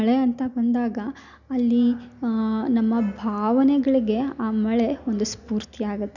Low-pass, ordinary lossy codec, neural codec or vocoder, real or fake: 7.2 kHz; none; none; real